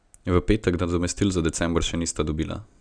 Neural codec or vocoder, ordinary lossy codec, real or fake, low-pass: none; none; real; 9.9 kHz